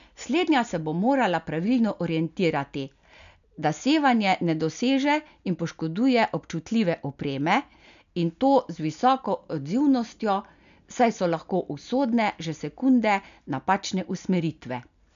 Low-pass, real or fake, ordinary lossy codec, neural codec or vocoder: 7.2 kHz; real; none; none